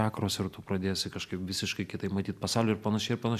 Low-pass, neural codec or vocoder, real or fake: 14.4 kHz; none; real